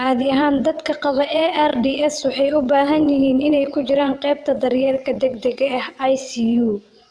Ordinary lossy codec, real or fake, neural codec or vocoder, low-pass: none; fake; vocoder, 22.05 kHz, 80 mel bands, WaveNeXt; none